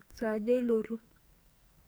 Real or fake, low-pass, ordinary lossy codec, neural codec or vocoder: fake; none; none; codec, 44.1 kHz, 2.6 kbps, SNAC